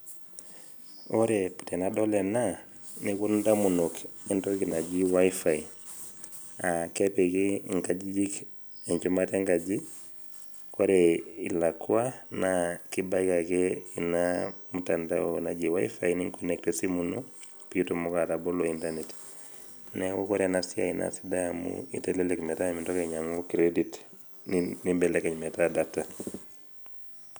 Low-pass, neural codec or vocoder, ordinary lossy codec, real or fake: none; none; none; real